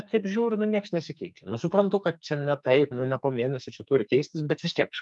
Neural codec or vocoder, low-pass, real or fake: codec, 44.1 kHz, 2.6 kbps, SNAC; 10.8 kHz; fake